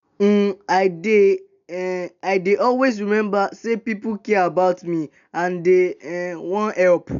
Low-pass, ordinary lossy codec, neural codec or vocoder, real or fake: 7.2 kHz; none; none; real